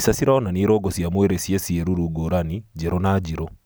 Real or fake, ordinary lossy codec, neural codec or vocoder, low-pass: real; none; none; none